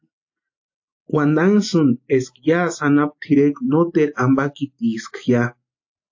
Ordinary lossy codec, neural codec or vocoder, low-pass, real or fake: AAC, 48 kbps; none; 7.2 kHz; real